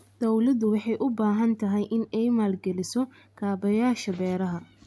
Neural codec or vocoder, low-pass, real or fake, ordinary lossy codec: none; none; real; none